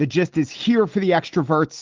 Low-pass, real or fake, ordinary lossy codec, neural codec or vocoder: 7.2 kHz; real; Opus, 16 kbps; none